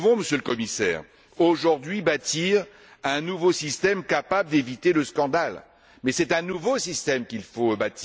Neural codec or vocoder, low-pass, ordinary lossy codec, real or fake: none; none; none; real